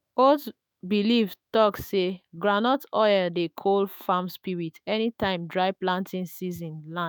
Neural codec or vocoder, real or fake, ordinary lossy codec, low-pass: autoencoder, 48 kHz, 128 numbers a frame, DAC-VAE, trained on Japanese speech; fake; none; none